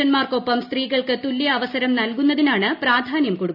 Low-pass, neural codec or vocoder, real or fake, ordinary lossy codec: 5.4 kHz; none; real; none